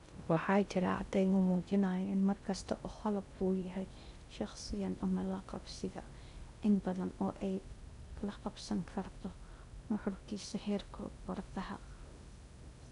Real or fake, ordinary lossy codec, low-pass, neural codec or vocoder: fake; none; 10.8 kHz; codec, 16 kHz in and 24 kHz out, 0.6 kbps, FocalCodec, streaming, 2048 codes